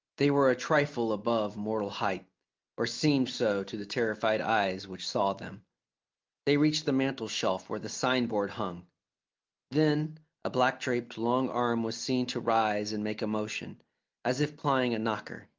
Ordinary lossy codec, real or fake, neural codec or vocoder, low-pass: Opus, 24 kbps; real; none; 7.2 kHz